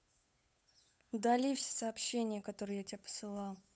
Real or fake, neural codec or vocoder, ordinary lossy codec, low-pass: fake; codec, 16 kHz, 8 kbps, FunCodec, trained on Chinese and English, 25 frames a second; none; none